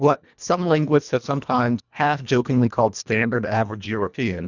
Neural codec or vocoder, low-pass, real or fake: codec, 24 kHz, 1.5 kbps, HILCodec; 7.2 kHz; fake